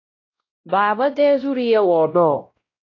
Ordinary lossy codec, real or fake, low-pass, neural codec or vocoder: AAC, 32 kbps; fake; 7.2 kHz; codec, 16 kHz, 0.5 kbps, X-Codec, WavLM features, trained on Multilingual LibriSpeech